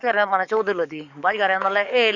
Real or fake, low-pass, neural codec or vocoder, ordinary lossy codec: fake; 7.2 kHz; codec, 44.1 kHz, 7.8 kbps, DAC; none